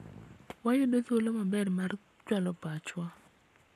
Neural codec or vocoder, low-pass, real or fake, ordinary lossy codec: codec, 44.1 kHz, 7.8 kbps, Pupu-Codec; 14.4 kHz; fake; none